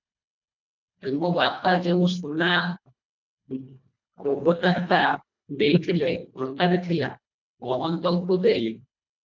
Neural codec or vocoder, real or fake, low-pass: codec, 24 kHz, 1.5 kbps, HILCodec; fake; 7.2 kHz